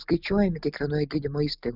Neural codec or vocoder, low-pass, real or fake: none; 5.4 kHz; real